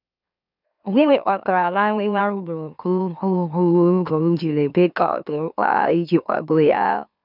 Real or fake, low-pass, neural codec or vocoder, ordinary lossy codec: fake; 5.4 kHz; autoencoder, 44.1 kHz, a latent of 192 numbers a frame, MeloTTS; none